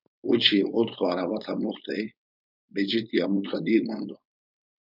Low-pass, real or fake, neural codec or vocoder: 5.4 kHz; fake; codec, 16 kHz, 4.8 kbps, FACodec